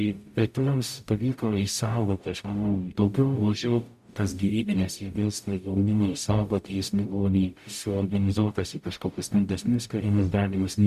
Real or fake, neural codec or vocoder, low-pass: fake; codec, 44.1 kHz, 0.9 kbps, DAC; 14.4 kHz